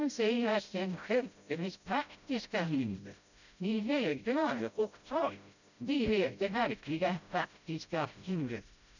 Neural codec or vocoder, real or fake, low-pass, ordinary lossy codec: codec, 16 kHz, 0.5 kbps, FreqCodec, smaller model; fake; 7.2 kHz; none